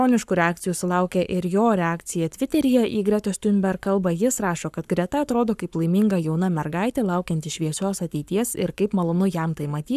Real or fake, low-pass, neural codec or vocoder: fake; 14.4 kHz; codec, 44.1 kHz, 7.8 kbps, Pupu-Codec